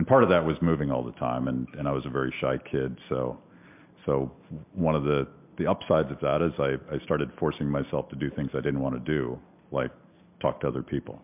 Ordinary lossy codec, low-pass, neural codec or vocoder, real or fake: MP3, 32 kbps; 3.6 kHz; none; real